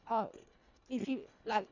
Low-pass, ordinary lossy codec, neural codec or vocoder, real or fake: 7.2 kHz; none; codec, 24 kHz, 1.5 kbps, HILCodec; fake